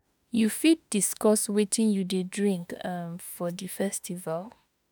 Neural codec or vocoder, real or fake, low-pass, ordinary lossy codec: autoencoder, 48 kHz, 32 numbers a frame, DAC-VAE, trained on Japanese speech; fake; none; none